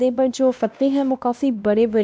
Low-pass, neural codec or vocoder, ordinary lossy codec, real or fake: none; codec, 16 kHz, 1 kbps, X-Codec, WavLM features, trained on Multilingual LibriSpeech; none; fake